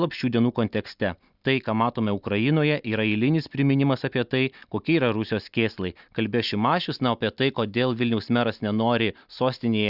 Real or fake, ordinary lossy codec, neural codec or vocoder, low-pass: real; Opus, 64 kbps; none; 5.4 kHz